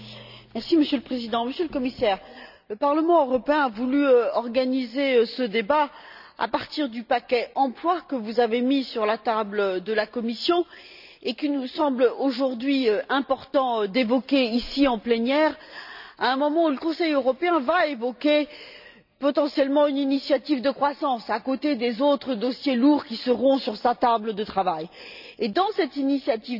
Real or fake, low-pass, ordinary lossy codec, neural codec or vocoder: real; 5.4 kHz; none; none